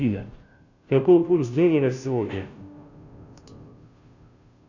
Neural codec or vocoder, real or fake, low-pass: codec, 16 kHz, 0.5 kbps, FunCodec, trained on Chinese and English, 25 frames a second; fake; 7.2 kHz